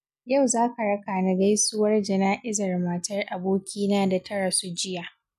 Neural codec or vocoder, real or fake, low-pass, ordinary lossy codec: none; real; 14.4 kHz; none